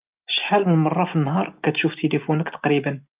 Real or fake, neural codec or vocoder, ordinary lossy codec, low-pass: real; none; Opus, 24 kbps; 3.6 kHz